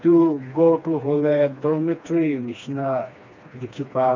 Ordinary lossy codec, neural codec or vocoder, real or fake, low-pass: MP3, 64 kbps; codec, 16 kHz, 2 kbps, FreqCodec, smaller model; fake; 7.2 kHz